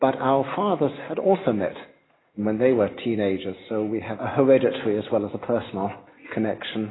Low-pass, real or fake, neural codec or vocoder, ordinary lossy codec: 7.2 kHz; real; none; AAC, 16 kbps